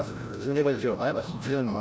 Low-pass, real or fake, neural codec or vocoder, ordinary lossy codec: none; fake; codec, 16 kHz, 0.5 kbps, FreqCodec, larger model; none